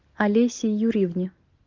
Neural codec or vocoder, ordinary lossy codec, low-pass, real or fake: none; Opus, 24 kbps; 7.2 kHz; real